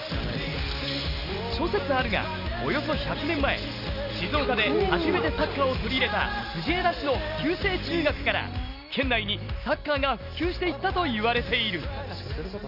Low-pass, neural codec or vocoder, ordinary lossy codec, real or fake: 5.4 kHz; none; none; real